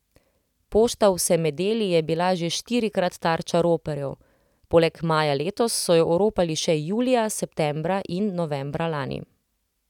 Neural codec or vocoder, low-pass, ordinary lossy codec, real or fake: none; 19.8 kHz; none; real